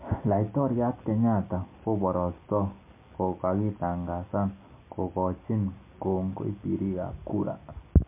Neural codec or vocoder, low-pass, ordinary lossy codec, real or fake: none; 3.6 kHz; MP3, 24 kbps; real